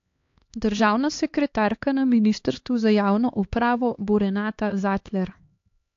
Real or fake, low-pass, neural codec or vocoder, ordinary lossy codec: fake; 7.2 kHz; codec, 16 kHz, 2 kbps, X-Codec, HuBERT features, trained on LibriSpeech; AAC, 48 kbps